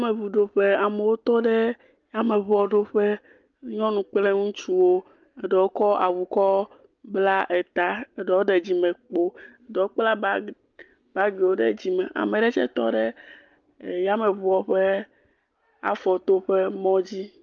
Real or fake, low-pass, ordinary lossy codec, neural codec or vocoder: real; 7.2 kHz; Opus, 24 kbps; none